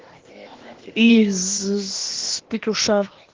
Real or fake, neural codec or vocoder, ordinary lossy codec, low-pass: fake; codec, 16 kHz, 0.8 kbps, ZipCodec; Opus, 16 kbps; 7.2 kHz